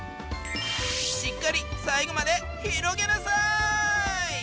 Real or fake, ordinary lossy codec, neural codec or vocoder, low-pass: real; none; none; none